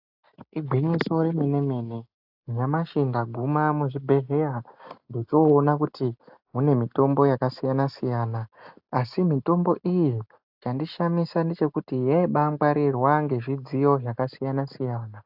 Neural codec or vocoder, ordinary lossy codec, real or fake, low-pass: none; AAC, 48 kbps; real; 5.4 kHz